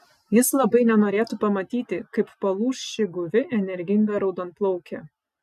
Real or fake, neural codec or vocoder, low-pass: real; none; 14.4 kHz